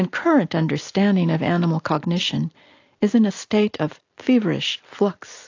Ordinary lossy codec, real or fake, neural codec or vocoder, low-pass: AAC, 48 kbps; real; none; 7.2 kHz